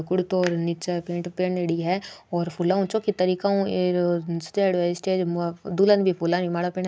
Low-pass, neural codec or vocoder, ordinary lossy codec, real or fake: none; none; none; real